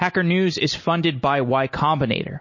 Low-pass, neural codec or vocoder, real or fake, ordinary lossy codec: 7.2 kHz; none; real; MP3, 32 kbps